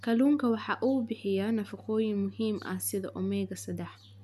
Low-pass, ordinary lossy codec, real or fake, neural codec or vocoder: 14.4 kHz; none; real; none